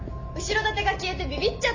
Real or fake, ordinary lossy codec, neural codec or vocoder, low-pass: real; MP3, 32 kbps; none; 7.2 kHz